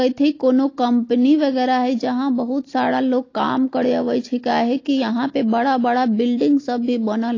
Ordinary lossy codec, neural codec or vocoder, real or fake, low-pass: AAC, 32 kbps; none; real; 7.2 kHz